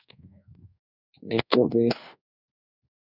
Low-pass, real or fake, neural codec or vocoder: 5.4 kHz; fake; codec, 24 kHz, 1.2 kbps, DualCodec